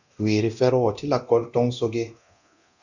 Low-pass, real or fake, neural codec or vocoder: 7.2 kHz; fake; codec, 24 kHz, 0.9 kbps, DualCodec